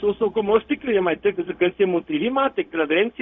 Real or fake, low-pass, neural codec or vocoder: fake; 7.2 kHz; codec, 16 kHz, 0.4 kbps, LongCat-Audio-Codec